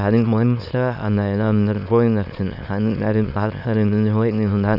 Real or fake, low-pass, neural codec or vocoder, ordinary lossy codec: fake; 5.4 kHz; autoencoder, 22.05 kHz, a latent of 192 numbers a frame, VITS, trained on many speakers; none